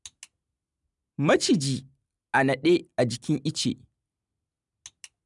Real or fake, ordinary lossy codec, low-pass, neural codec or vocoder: real; none; 10.8 kHz; none